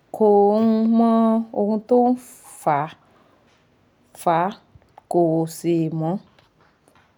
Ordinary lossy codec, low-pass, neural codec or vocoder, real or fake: none; 19.8 kHz; none; real